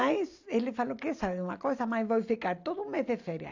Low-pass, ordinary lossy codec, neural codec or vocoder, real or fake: 7.2 kHz; AAC, 48 kbps; none; real